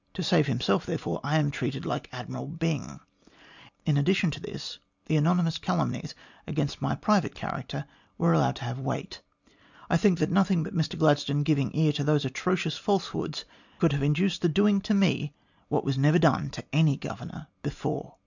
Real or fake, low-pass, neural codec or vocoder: real; 7.2 kHz; none